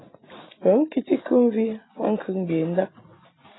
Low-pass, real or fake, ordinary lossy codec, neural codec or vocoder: 7.2 kHz; real; AAC, 16 kbps; none